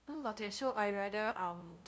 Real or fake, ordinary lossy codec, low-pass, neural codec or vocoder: fake; none; none; codec, 16 kHz, 0.5 kbps, FunCodec, trained on LibriTTS, 25 frames a second